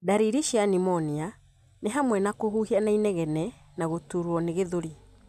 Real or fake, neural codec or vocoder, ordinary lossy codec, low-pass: real; none; none; 14.4 kHz